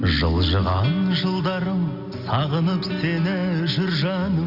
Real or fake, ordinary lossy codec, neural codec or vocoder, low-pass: real; none; none; 5.4 kHz